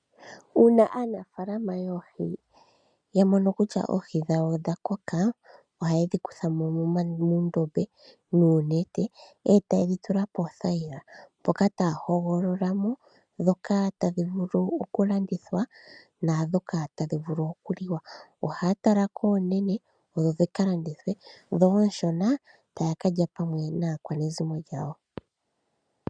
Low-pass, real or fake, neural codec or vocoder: 9.9 kHz; real; none